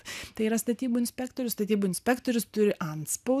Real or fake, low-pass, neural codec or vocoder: real; 14.4 kHz; none